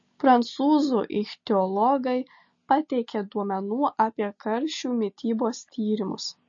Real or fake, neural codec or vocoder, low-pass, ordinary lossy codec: real; none; 7.2 kHz; MP3, 32 kbps